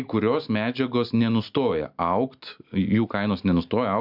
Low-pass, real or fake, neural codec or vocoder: 5.4 kHz; real; none